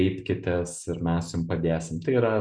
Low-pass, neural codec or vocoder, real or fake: 9.9 kHz; none; real